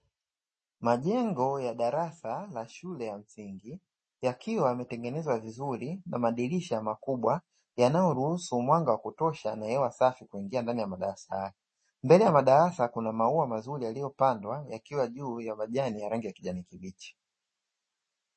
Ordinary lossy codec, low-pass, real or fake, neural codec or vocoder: MP3, 32 kbps; 10.8 kHz; real; none